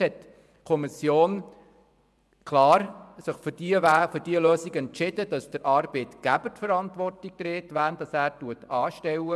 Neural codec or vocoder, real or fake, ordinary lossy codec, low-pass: vocoder, 24 kHz, 100 mel bands, Vocos; fake; none; none